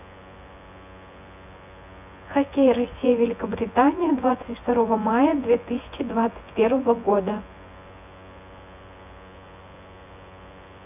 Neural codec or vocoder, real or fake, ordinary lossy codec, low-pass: vocoder, 24 kHz, 100 mel bands, Vocos; fake; none; 3.6 kHz